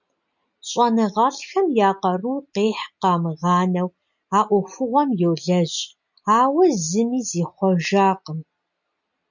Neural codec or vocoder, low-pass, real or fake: none; 7.2 kHz; real